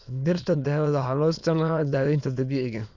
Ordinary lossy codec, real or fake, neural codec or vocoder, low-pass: Opus, 64 kbps; fake; autoencoder, 22.05 kHz, a latent of 192 numbers a frame, VITS, trained on many speakers; 7.2 kHz